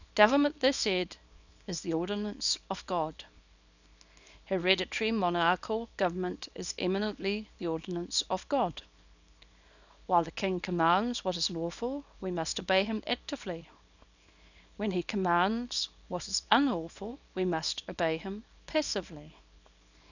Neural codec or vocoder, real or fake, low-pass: codec, 24 kHz, 0.9 kbps, WavTokenizer, small release; fake; 7.2 kHz